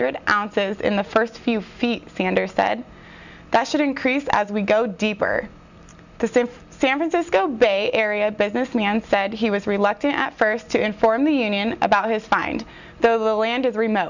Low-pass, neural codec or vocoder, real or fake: 7.2 kHz; none; real